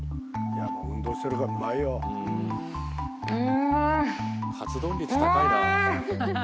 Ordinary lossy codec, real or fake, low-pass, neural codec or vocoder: none; real; none; none